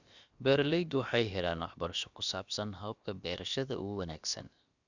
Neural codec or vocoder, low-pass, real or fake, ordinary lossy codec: codec, 16 kHz, about 1 kbps, DyCAST, with the encoder's durations; 7.2 kHz; fake; Opus, 64 kbps